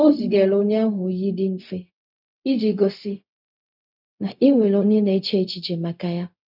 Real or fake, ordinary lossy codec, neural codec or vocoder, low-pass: fake; none; codec, 16 kHz, 0.4 kbps, LongCat-Audio-Codec; 5.4 kHz